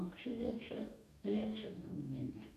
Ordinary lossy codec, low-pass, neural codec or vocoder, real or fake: none; 14.4 kHz; codec, 44.1 kHz, 2.6 kbps, DAC; fake